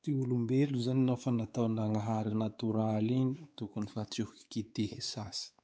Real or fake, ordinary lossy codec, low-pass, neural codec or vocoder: fake; none; none; codec, 16 kHz, 4 kbps, X-Codec, WavLM features, trained on Multilingual LibriSpeech